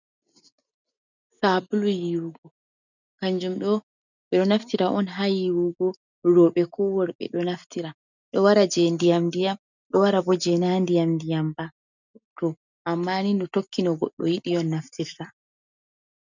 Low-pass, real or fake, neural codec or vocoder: 7.2 kHz; real; none